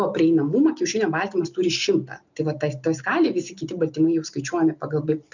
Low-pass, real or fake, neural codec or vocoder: 7.2 kHz; real; none